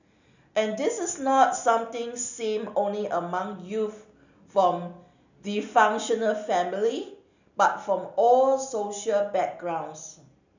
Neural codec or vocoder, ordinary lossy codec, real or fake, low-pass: none; none; real; 7.2 kHz